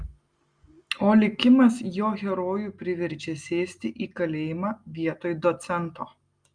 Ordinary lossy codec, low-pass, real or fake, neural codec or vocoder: Opus, 32 kbps; 9.9 kHz; real; none